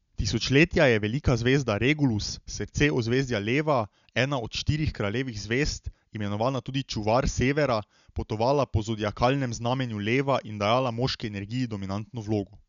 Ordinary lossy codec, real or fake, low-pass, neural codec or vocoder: none; real; 7.2 kHz; none